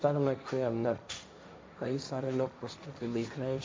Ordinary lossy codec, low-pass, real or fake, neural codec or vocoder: none; none; fake; codec, 16 kHz, 1.1 kbps, Voila-Tokenizer